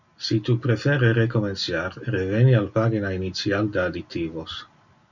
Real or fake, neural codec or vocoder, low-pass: real; none; 7.2 kHz